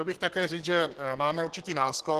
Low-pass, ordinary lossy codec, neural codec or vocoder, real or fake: 14.4 kHz; Opus, 16 kbps; codec, 32 kHz, 1.9 kbps, SNAC; fake